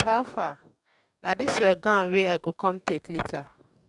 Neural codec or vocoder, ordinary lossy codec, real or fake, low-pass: codec, 44.1 kHz, 2.6 kbps, DAC; MP3, 96 kbps; fake; 10.8 kHz